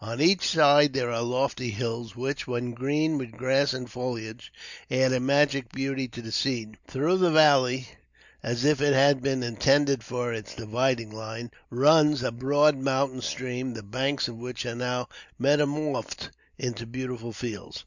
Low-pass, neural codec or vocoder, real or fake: 7.2 kHz; none; real